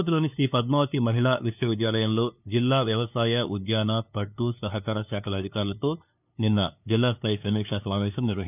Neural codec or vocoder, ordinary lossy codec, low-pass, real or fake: codec, 16 kHz, 2 kbps, FunCodec, trained on LibriTTS, 25 frames a second; none; 3.6 kHz; fake